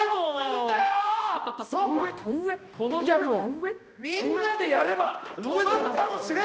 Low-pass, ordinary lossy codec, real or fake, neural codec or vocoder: none; none; fake; codec, 16 kHz, 1 kbps, X-Codec, HuBERT features, trained on balanced general audio